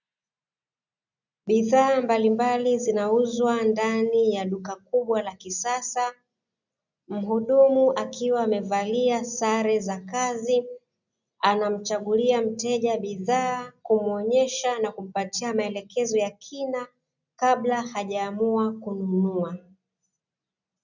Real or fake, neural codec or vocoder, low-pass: real; none; 7.2 kHz